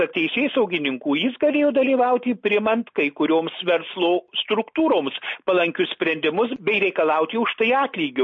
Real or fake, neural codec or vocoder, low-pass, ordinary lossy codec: real; none; 7.2 kHz; MP3, 32 kbps